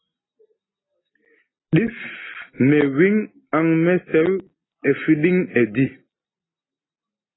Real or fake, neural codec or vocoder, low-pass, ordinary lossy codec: real; none; 7.2 kHz; AAC, 16 kbps